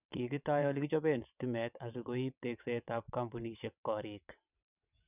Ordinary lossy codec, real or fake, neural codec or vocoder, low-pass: none; fake; vocoder, 22.05 kHz, 80 mel bands, WaveNeXt; 3.6 kHz